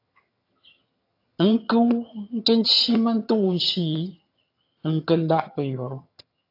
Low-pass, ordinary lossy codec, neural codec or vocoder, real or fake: 5.4 kHz; AAC, 32 kbps; vocoder, 22.05 kHz, 80 mel bands, HiFi-GAN; fake